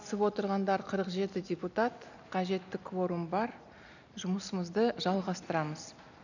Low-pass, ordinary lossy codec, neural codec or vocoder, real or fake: 7.2 kHz; none; none; real